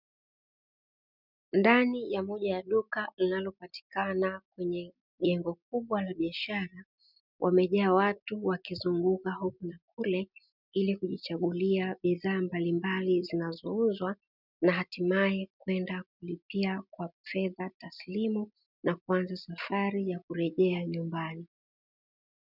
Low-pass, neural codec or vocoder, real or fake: 5.4 kHz; none; real